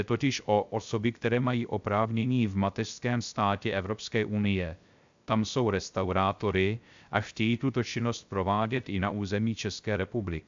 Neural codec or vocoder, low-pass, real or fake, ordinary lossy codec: codec, 16 kHz, 0.3 kbps, FocalCodec; 7.2 kHz; fake; MP3, 64 kbps